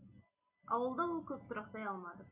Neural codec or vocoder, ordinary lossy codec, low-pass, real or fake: none; none; 3.6 kHz; real